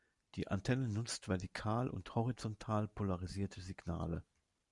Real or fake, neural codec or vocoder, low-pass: real; none; 10.8 kHz